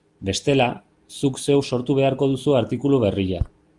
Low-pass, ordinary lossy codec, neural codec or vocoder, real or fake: 10.8 kHz; Opus, 32 kbps; none; real